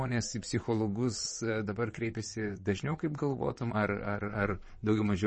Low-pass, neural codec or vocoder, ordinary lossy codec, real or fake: 9.9 kHz; vocoder, 22.05 kHz, 80 mel bands, WaveNeXt; MP3, 32 kbps; fake